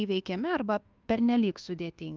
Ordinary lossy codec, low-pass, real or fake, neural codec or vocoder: Opus, 24 kbps; 7.2 kHz; fake; codec, 24 kHz, 1.2 kbps, DualCodec